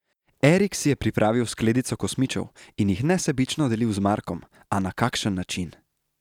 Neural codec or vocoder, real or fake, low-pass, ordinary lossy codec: none; real; 19.8 kHz; none